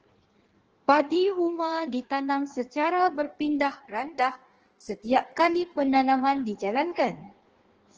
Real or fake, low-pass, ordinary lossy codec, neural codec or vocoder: fake; 7.2 kHz; Opus, 16 kbps; codec, 16 kHz in and 24 kHz out, 1.1 kbps, FireRedTTS-2 codec